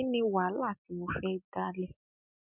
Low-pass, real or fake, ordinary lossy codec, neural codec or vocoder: 3.6 kHz; real; none; none